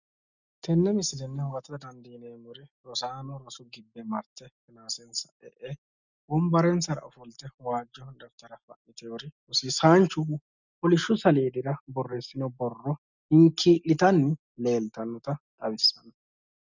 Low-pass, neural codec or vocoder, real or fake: 7.2 kHz; none; real